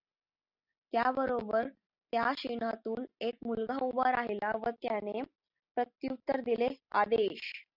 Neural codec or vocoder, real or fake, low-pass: none; real; 5.4 kHz